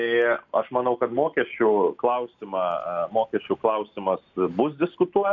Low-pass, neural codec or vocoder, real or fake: 7.2 kHz; none; real